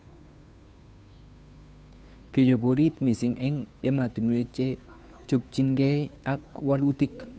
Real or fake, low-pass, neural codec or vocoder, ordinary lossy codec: fake; none; codec, 16 kHz, 2 kbps, FunCodec, trained on Chinese and English, 25 frames a second; none